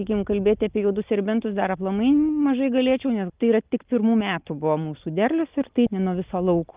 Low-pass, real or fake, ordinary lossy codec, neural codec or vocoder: 3.6 kHz; real; Opus, 24 kbps; none